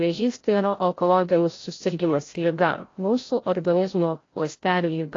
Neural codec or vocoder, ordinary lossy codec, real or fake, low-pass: codec, 16 kHz, 0.5 kbps, FreqCodec, larger model; AAC, 32 kbps; fake; 7.2 kHz